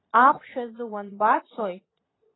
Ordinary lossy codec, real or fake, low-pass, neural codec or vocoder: AAC, 16 kbps; fake; 7.2 kHz; vocoder, 22.05 kHz, 80 mel bands, Vocos